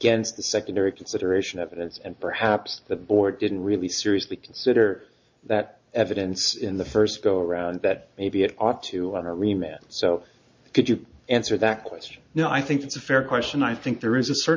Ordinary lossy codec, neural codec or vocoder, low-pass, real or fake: MP3, 64 kbps; none; 7.2 kHz; real